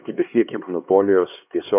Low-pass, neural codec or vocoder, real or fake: 3.6 kHz; codec, 16 kHz, 2 kbps, X-Codec, HuBERT features, trained on LibriSpeech; fake